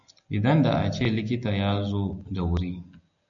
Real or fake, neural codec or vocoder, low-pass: real; none; 7.2 kHz